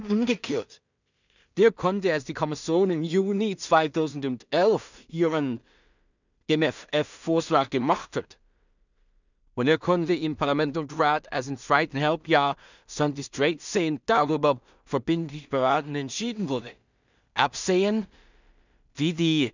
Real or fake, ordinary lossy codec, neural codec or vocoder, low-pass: fake; none; codec, 16 kHz in and 24 kHz out, 0.4 kbps, LongCat-Audio-Codec, two codebook decoder; 7.2 kHz